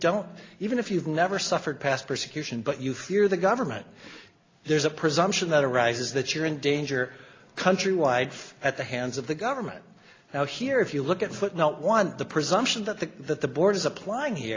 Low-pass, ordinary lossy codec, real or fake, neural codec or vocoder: 7.2 kHz; AAC, 32 kbps; real; none